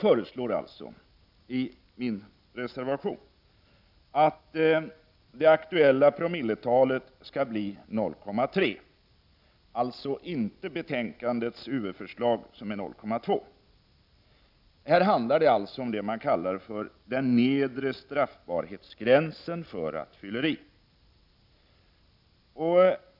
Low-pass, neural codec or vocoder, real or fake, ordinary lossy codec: 5.4 kHz; none; real; none